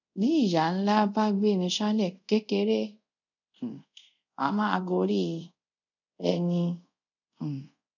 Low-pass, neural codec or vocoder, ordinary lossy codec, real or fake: 7.2 kHz; codec, 24 kHz, 0.5 kbps, DualCodec; none; fake